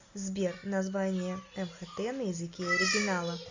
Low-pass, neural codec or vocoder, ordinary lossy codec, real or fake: 7.2 kHz; none; AAC, 48 kbps; real